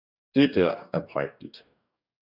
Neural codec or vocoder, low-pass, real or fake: codec, 44.1 kHz, 2.6 kbps, DAC; 5.4 kHz; fake